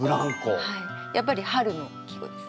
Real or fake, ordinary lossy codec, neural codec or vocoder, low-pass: real; none; none; none